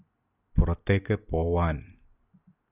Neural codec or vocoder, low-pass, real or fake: none; 3.6 kHz; real